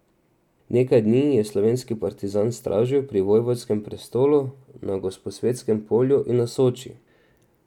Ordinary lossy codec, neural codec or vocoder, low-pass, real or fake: none; none; 19.8 kHz; real